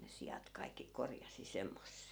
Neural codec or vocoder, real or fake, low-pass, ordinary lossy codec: none; real; none; none